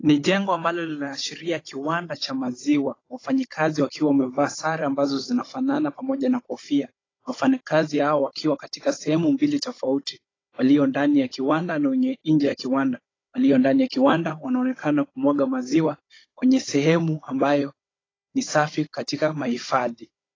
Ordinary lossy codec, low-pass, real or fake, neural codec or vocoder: AAC, 32 kbps; 7.2 kHz; fake; codec, 16 kHz, 16 kbps, FunCodec, trained on Chinese and English, 50 frames a second